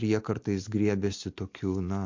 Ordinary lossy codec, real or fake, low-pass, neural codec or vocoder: MP3, 48 kbps; real; 7.2 kHz; none